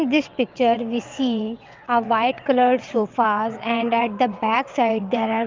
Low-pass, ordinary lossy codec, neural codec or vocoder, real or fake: 7.2 kHz; Opus, 24 kbps; vocoder, 22.05 kHz, 80 mel bands, WaveNeXt; fake